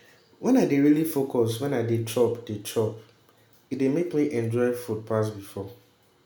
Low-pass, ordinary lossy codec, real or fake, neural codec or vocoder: none; none; real; none